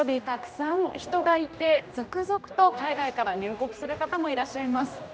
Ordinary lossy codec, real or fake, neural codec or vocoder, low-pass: none; fake; codec, 16 kHz, 1 kbps, X-Codec, HuBERT features, trained on general audio; none